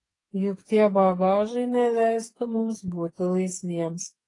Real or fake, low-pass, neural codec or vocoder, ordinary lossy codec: fake; 10.8 kHz; codec, 44.1 kHz, 2.6 kbps, SNAC; AAC, 32 kbps